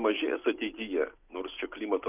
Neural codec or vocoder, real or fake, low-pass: none; real; 3.6 kHz